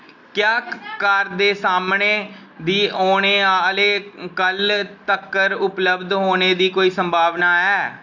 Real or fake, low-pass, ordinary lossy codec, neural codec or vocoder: real; 7.2 kHz; none; none